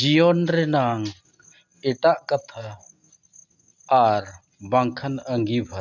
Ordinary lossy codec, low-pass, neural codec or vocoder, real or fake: none; 7.2 kHz; none; real